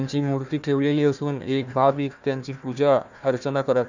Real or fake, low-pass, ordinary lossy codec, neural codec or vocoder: fake; 7.2 kHz; none; codec, 16 kHz, 1 kbps, FunCodec, trained on Chinese and English, 50 frames a second